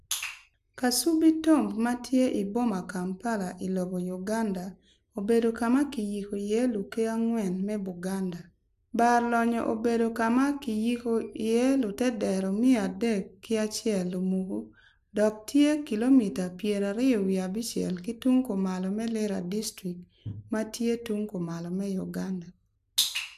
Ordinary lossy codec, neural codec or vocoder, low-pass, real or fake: AAC, 96 kbps; none; 14.4 kHz; real